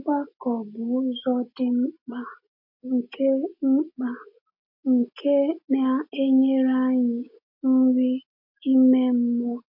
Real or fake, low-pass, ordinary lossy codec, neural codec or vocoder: real; 5.4 kHz; MP3, 32 kbps; none